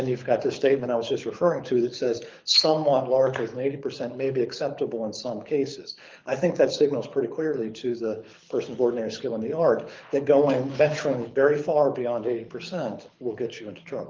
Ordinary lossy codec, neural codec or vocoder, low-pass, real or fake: Opus, 32 kbps; codec, 24 kHz, 6 kbps, HILCodec; 7.2 kHz; fake